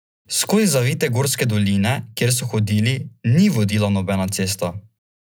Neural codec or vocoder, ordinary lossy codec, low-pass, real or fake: none; none; none; real